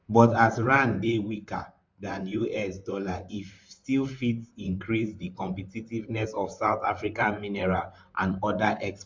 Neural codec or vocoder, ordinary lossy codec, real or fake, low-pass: vocoder, 44.1 kHz, 128 mel bands, Pupu-Vocoder; MP3, 64 kbps; fake; 7.2 kHz